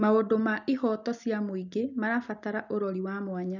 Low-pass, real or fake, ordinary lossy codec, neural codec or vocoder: 7.2 kHz; real; none; none